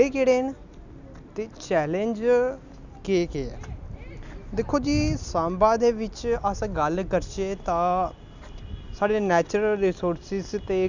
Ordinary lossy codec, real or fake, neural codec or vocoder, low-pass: none; real; none; 7.2 kHz